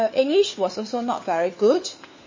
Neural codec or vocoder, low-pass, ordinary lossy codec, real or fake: codec, 16 kHz, 4 kbps, FunCodec, trained on LibriTTS, 50 frames a second; 7.2 kHz; MP3, 32 kbps; fake